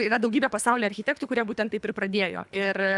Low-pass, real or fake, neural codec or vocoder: 10.8 kHz; fake; codec, 24 kHz, 3 kbps, HILCodec